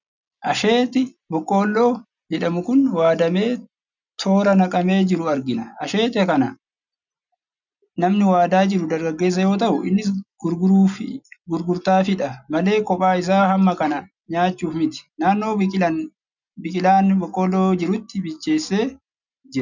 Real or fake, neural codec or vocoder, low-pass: real; none; 7.2 kHz